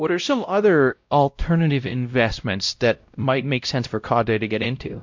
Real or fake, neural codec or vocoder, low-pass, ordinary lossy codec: fake; codec, 16 kHz, 0.5 kbps, X-Codec, WavLM features, trained on Multilingual LibriSpeech; 7.2 kHz; MP3, 64 kbps